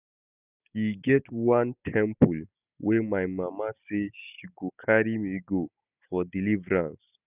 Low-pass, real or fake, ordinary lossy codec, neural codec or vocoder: 3.6 kHz; real; none; none